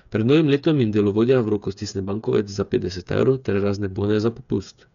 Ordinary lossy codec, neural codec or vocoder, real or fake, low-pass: none; codec, 16 kHz, 8 kbps, FreqCodec, smaller model; fake; 7.2 kHz